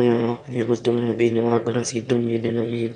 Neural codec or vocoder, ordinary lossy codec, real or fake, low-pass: autoencoder, 22.05 kHz, a latent of 192 numbers a frame, VITS, trained on one speaker; none; fake; 9.9 kHz